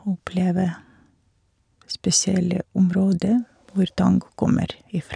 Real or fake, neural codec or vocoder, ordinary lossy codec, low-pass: real; none; none; 9.9 kHz